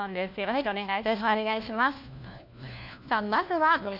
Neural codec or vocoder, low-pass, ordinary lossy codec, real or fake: codec, 16 kHz, 1 kbps, FunCodec, trained on LibriTTS, 50 frames a second; 5.4 kHz; none; fake